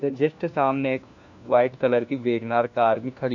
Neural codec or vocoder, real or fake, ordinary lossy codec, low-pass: codec, 16 kHz, 1 kbps, FunCodec, trained on LibriTTS, 50 frames a second; fake; none; 7.2 kHz